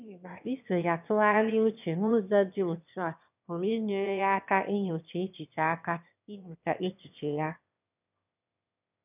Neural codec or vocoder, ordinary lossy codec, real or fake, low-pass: autoencoder, 22.05 kHz, a latent of 192 numbers a frame, VITS, trained on one speaker; none; fake; 3.6 kHz